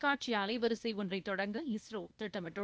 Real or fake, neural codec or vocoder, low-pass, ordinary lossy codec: fake; codec, 16 kHz, 0.8 kbps, ZipCodec; none; none